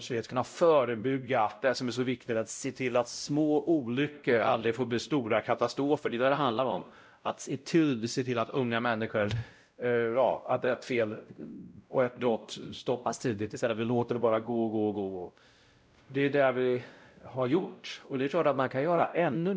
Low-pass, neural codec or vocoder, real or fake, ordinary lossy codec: none; codec, 16 kHz, 0.5 kbps, X-Codec, WavLM features, trained on Multilingual LibriSpeech; fake; none